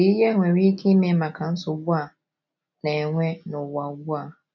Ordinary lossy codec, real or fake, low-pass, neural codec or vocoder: none; real; 7.2 kHz; none